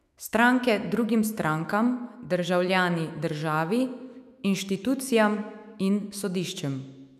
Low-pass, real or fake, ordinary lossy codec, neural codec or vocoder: 14.4 kHz; fake; none; autoencoder, 48 kHz, 128 numbers a frame, DAC-VAE, trained on Japanese speech